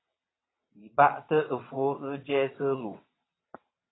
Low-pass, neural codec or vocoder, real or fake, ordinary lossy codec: 7.2 kHz; vocoder, 22.05 kHz, 80 mel bands, WaveNeXt; fake; AAC, 16 kbps